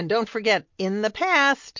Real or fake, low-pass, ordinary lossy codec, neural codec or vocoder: real; 7.2 kHz; MP3, 48 kbps; none